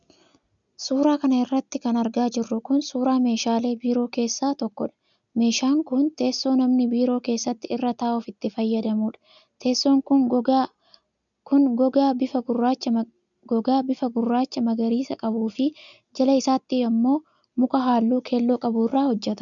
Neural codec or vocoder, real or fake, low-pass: none; real; 7.2 kHz